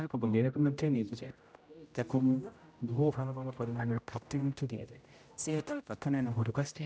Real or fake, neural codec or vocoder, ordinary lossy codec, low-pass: fake; codec, 16 kHz, 0.5 kbps, X-Codec, HuBERT features, trained on general audio; none; none